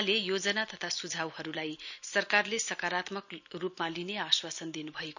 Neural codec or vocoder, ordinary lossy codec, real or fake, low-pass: none; none; real; 7.2 kHz